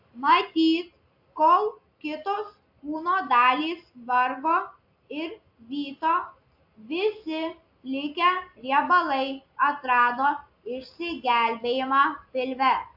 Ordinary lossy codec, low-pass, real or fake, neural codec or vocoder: AAC, 48 kbps; 5.4 kHz; real; none